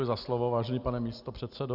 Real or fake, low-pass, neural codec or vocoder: real; 5.4 kHz; none